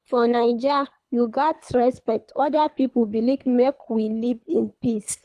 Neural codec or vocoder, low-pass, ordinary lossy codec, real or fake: codec, 24 kHz, 3 kbps, HILCodec; none; none; fake